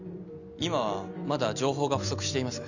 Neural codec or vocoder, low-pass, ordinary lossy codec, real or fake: none; 7.2 kHz; none; real